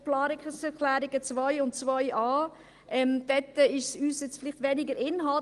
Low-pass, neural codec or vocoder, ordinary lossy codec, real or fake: 10.8 kHz; none; Opus, 24 kbps; real